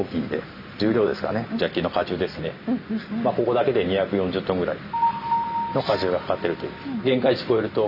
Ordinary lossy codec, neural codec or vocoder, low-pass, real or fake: none; none; 5.4 kHz; real